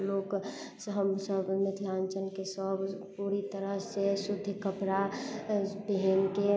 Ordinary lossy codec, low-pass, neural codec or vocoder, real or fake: none; none; none; real